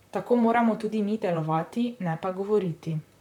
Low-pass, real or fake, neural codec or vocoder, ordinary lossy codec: 19.8 kHz; fake; vocoder, 44.1 kHz, 128 mel bands, Pupu-Vocoder; none